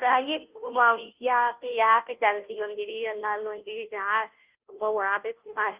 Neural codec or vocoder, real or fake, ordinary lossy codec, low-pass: codec, 16 kHz, 0.5 kbps, FunCodec, trained on Chinese and English, 25 frames a second; fake; Opus, 32 kbps; 3.6 kHz